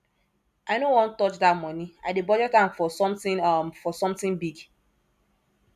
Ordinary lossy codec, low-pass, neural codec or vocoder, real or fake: none; 14.4 kHz; none; real